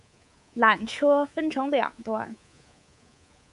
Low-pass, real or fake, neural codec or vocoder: 10.8 kHz; fake; codec, 24 kHz, 3.1 kbps, DualCodec